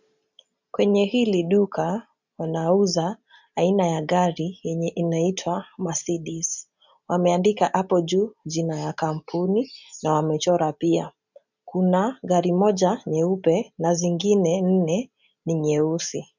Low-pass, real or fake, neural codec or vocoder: 7.2 kHz; real; none